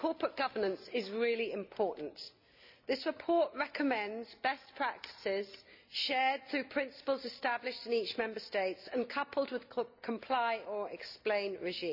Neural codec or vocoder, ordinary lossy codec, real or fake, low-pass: none; none; real; 5.4 kHz